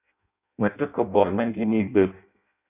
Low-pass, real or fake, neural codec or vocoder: 3.6 kHz; fake; codec, 16 kHz in and 24 kHz out, 0.6 kbps, FireRedTTS-2 codec